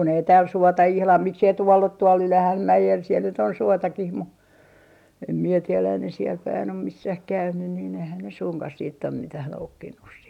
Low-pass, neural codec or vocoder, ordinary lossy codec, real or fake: 19.8 kHz; none; none; real